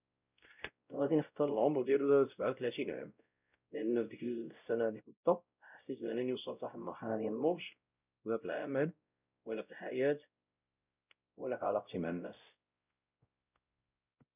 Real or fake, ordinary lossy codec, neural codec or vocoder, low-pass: fake; none; codec, 16 kHz, 0.5 kbps, X-Codec, WavLM features, trained on Multilingual LibriSpeech; 3.6 kHz